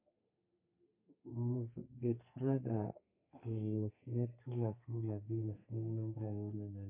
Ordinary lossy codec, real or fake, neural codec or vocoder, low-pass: MP3, 24 kbps; fake; codec, 32 kHz, 1.9 kbps, SNAC; 3.6 kHz